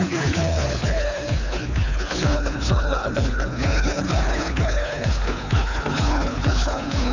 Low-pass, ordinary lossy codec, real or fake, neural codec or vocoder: 7.2 kHz; none; fake; codec, 24 kHz, 3 kbps, HILCodec